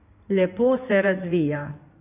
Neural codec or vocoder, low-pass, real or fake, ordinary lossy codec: codec, 16 kHz in and 24 kHz out, 2.2 kbps, FireRedTTS-2 codec; 3.6 kHz; fake; none